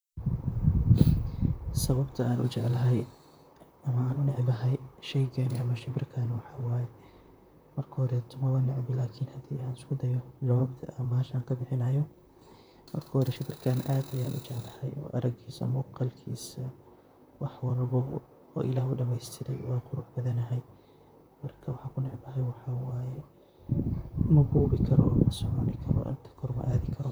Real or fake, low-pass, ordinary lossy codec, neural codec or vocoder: fake; none; none; vocoder, 44.1 kHz, 128 mel bands, Pupu-Vocoder